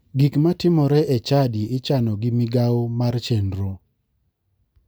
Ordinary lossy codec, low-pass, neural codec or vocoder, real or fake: none; none; none; real